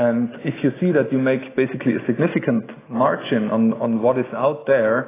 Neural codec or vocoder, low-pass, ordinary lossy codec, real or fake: none; 3.6 kHz; AAC, 16 kbps; real